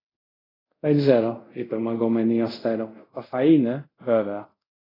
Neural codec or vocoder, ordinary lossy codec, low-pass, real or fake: codec, 24 kHz, 0.5 kbps, DualCodec; AAC, 24 kbps; 5.4 kHz; fake